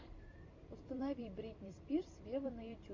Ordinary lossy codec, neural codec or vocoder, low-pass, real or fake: MP3, 48 kbps; vocoder, 44.1 kHz, 128 mel bands every 256 samples, BigVGAN v2; 7.2 kHz; fake